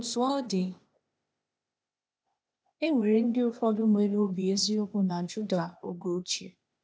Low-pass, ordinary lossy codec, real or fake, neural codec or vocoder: none; none; fake; codec, 16 kHz, 0.8 kbps, ZipCodec